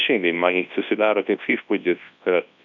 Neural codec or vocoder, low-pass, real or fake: codec, 24 kHz, 0.9 kbps, WavTokenizer, large speech release; 7.2 kHz; fake